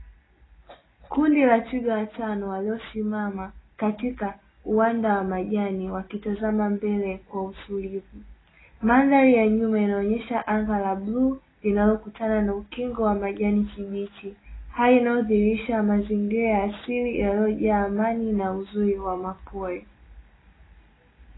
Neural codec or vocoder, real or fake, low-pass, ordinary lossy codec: none; real; 7.2 kHz; AAC, 16 kbps